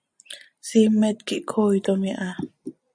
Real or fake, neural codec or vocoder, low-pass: real; none; 9.9 kHz